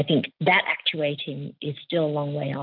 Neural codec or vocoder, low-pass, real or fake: none; 5.4 kHz; real